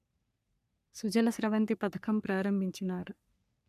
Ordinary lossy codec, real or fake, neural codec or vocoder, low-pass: none; fake; codec, 44.1 kHz, 3.4 kbps, Pupu-Codec; 14.4 kHz